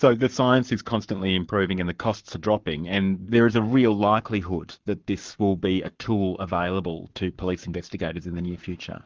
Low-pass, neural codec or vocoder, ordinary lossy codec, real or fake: 7.2 kHz; codec, 44.1 kHz, 7.8 kbps, Pupu-Codec; Opus, 24 kbps; fake